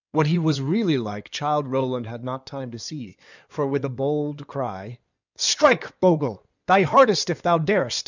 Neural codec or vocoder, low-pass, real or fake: codec, 16 kHz in and 24 kHz out, 2.2 kbps, FireRedTTS-2 codec; 7.2 kHz; fake